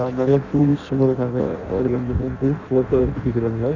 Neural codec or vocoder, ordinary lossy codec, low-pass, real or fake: codec, 16 kHz in and 24 kHz out, 0.6 kbps, FireRedTTS-2 codec; none; 7.2 kHz; fake